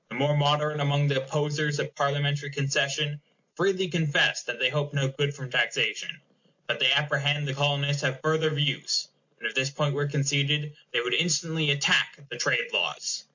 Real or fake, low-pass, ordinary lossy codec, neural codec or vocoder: real; 7.2 kHz; MP3, 48 kbps; none